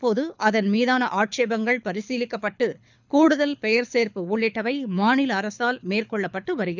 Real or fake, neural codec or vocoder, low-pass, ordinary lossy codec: fake; codec, 24 kHz, 6 kbps, HILCodec; 7.2 kHz; none